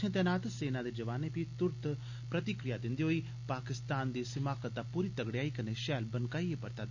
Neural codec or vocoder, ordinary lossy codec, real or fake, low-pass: none; Opus, 64 kbps; real; 7.2 kHz